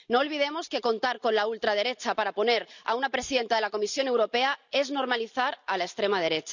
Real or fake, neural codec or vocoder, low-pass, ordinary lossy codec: real; none; 7.2 kHz; none